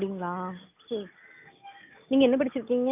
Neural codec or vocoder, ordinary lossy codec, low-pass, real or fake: none; none; 3.6 kHz; real